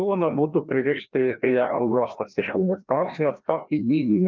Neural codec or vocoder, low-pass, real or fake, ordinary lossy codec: codec, 16 kHz, 1 kbps, FreqCodec, larger model; 7.2 kHz; fake; Opus, 24 kbps